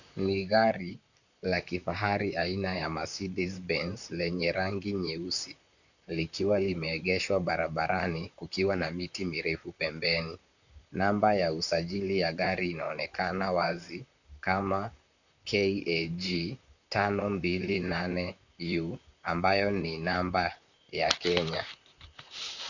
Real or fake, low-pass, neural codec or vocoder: fake; 7.2 kHz; vocoder, 44.1 kHz, 128 mel bands, Pupu-Vocoder